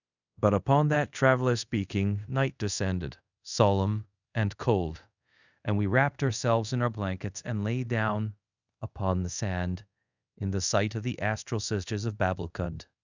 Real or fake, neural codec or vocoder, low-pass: fake; codec, 24 kHz, 0.5 kbps, DualCodec; 7.2 kHz